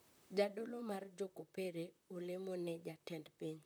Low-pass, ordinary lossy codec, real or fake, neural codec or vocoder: none; none; fake; vocoder, 44.1 kHz, 128 mel bands, Pupu-Vocoder